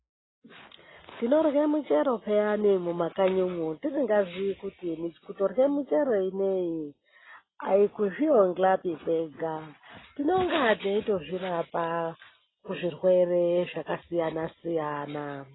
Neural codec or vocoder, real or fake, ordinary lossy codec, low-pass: none; real; AAC, 16 kbps; 7.2 kHz